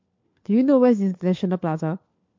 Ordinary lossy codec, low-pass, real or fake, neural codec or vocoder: MP3, 48 kbps; 7.2 kHz; fake; codec, 16 kHz, 4 kbps, FunCodec, trained on LibriTTS, 50 frames a second